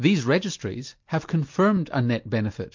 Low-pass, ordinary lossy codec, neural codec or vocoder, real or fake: 7.2 kHz; MP3, 48 kbps; none; real